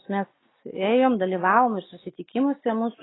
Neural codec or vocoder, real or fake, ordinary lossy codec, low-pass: none; real; AAC, 16 kbps; 7.2 kHz